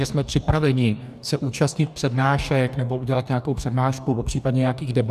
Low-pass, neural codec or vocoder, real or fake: 14.4 kHz; codec, 44.1 kHz, 2.6 kbps, DAC; fake